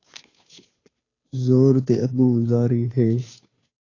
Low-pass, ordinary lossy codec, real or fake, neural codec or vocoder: 7.2 kHz; AAC, 32 kbps; fake; codec, 16 kHz, 2 kbps, FunCodec, trained on Chinese and English, 25 frames a second